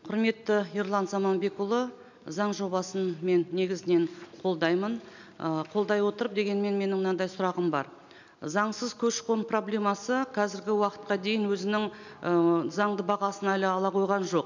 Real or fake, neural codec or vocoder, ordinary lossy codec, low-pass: real; none; none; 7.2 kHz